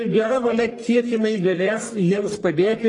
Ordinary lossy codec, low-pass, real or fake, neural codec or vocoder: AAC, 32 kbps; 10.8 kHz; fake; codec, 44.1 kHz, 1.7 kbps, Pupu-Codec